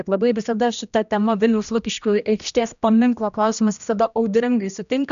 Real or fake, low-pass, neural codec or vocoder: fake; 7.2 kHz; codec, 16 kHz, 1 kbps, X-Codec, HuBERT features, trained on general audio